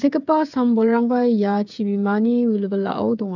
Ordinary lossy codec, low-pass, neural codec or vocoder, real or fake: none; 7.2 kHz; codec, 16 kHz, 8 kbps, FreqCodec, smaller model; fake